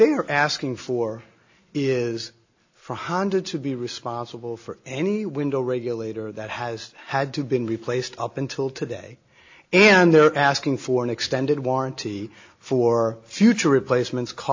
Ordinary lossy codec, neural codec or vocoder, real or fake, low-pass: AAC, 48 kbps; none; real; 7.2 kHz